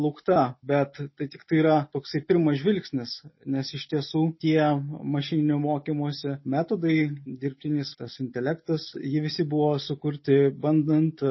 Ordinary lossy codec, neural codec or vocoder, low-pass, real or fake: MP3, 24 kbps; none; 7.2 kHz; real